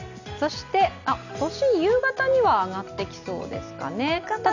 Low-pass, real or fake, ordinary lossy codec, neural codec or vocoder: 7.2 kHz; real; none; none